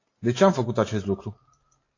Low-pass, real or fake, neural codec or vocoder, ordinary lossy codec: 7.2 kHz; real; none; AAC, 32 kbps